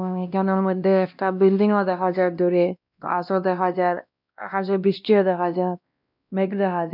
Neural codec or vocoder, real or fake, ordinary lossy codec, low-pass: codec, 16 kHz, 1 kbps, X-Codec, WavLM features, trained on Multilingual LibriSpeech; fake; none; 5.4 kHz